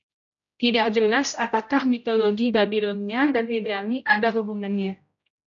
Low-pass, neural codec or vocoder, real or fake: 7.2 kHz; codec, 16 kHz, 0.5 kbps, X-Codec, HuBERT features, trained on general audio; fake